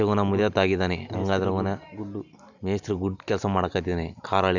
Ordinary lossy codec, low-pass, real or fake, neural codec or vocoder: none; 7.2 kHz; real; none